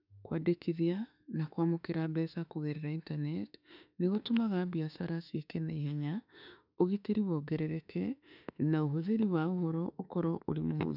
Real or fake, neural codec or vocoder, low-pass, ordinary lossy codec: fake; autoencoder, 48 kHz, 32 numbers a frame, DAC-VAE, trained on Japanese speech; 5.4 kHz; none